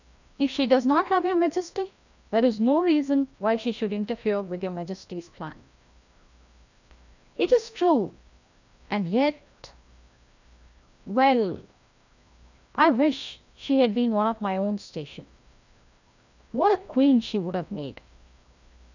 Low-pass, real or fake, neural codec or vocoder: 7.2 kHz; fake; codec, 16 kHz, 1 kbps, FreqCodec, larger model